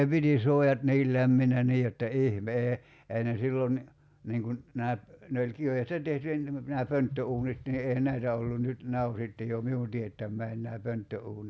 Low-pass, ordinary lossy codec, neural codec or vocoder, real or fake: none; none; none; real